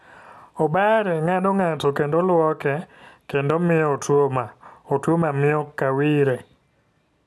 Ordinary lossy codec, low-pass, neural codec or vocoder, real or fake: none; none; none; real